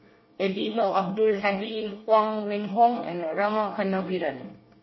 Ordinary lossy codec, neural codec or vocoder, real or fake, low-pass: MP3, 24 kbps; codec, 24 kHz, 1 kbps, SNAC; fake; 7.2 kHz